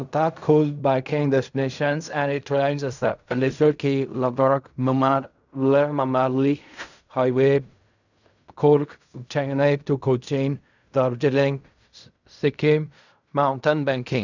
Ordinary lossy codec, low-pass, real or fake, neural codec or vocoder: none; 7.2 kHz; fake; codec, 16 kHz in and 24 kHz out, 0.4 kbps, LongCat-Audio-Codec, fine tuned four codebook decoder